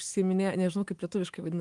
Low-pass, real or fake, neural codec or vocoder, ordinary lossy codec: 10.8 kHz; fake; autoencoder, 48 kHz, 128 numbers a frame, DAC-VAE, trained on Japanese speech; Opus, 24 kbps